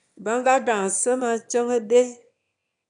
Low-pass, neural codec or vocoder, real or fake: 9.9 kHz; autoencoder, 22.05 kHz, a latent of 192 numbers a frame, VITS, trained on one speaker; fake